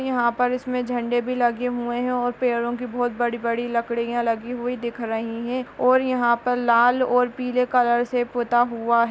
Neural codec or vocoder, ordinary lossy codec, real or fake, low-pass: none; none; real; none